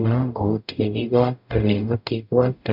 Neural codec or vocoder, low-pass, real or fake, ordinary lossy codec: codec, 44.1 kHz, 0.9 kbps, DAC; 5.4 kHz; fake; none